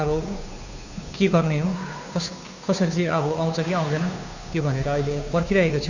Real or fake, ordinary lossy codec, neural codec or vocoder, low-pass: fake; none; codec, 16 kHz, 2 kbps, FunCodec, trained on Chinese and English, 25 frames a second; 7.2 kHz